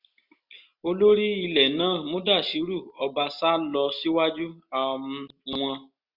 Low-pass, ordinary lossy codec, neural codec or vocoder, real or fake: 5.4 kHz; Opus, 64 kbps; none; real